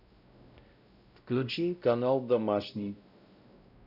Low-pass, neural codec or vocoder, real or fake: 5.4 kHz; codec, 16 kHz, 0.5 kbps, X-Codec, WavLM features, trained on Multilingual LibriSpeech; fake